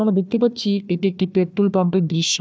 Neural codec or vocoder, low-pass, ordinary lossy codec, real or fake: codec, 16 kHz, 1 kbps, FunCodec, trained on Chinese and English, 50 frames a second; none; none; fake